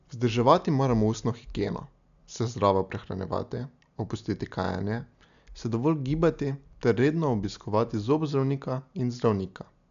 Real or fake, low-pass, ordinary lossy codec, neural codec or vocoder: real; 7.2 kHz; AAC, 96 kbps; none